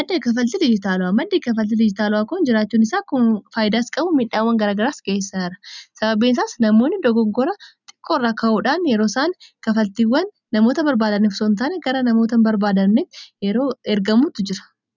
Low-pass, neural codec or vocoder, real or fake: 7.2 kHz; none; real